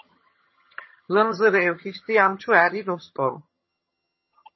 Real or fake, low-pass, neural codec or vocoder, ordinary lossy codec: fake; 7.2 kHz; vocoder, 22.05 kHz, 80 mel bands, HiFi-GAN; MP3, 24 kbps